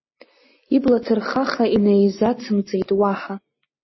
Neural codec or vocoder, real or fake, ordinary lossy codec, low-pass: none; real; MP3, 24 kbps; 7.2 kHz